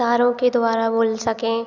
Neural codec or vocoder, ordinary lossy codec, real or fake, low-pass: none; none; real; 7.2 kHz